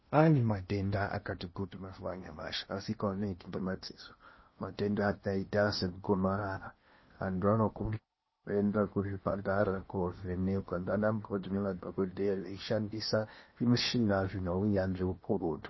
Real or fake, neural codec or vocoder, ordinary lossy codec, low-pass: fake; codec, 16 kHz in and 24 kHz out, 0.6 kbps, FocalCodec, streaming, 2048 codes; MP3, 24 kbps; 7.2 kHz